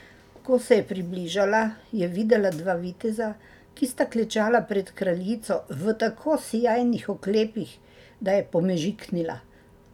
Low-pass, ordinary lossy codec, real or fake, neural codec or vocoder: 19.8 kHz; none; real; none